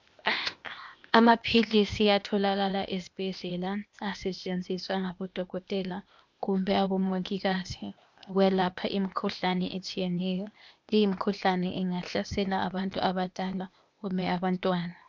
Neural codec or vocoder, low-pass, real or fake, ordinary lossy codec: codec, 16 kHz, 0.8 kbps, ZipCodec; 7.2 kHz; fake; MP3, 64 kbps